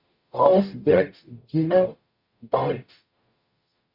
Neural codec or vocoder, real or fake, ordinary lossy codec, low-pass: codec, 44.1 kHz, 0.9 kbps, DAC; fake; Opus, 64 kbps; 5.4 kHz